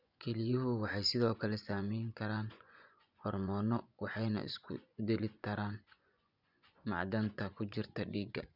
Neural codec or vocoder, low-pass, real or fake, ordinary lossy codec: vocoder, 24 kHz, 100 mel bands, Vocos; 5.4 kHz; fake; none